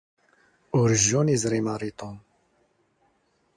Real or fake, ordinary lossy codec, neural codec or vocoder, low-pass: real; MP3, 64 kbps; none; 9.9 kHz